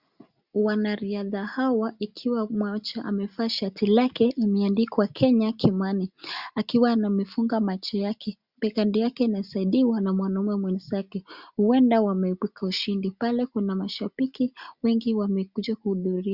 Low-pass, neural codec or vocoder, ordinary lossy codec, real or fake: 5.4 kHz; none; Opus, 64 kbps; real